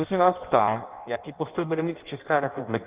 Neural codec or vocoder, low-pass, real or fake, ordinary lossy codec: codec, 16 kHz in and 24 kHz out, 0.6 kbps, FireRedTTS-2 codec; 3.6 kHz; fake; Opus, 32 kbps